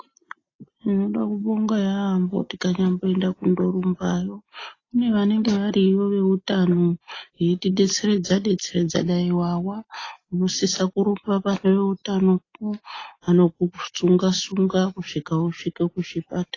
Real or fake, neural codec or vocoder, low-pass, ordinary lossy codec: real; none; 7.2 kHz; AAC, 32 kbps